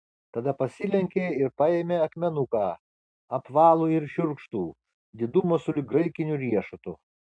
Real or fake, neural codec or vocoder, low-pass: real; none; 9.9 kHz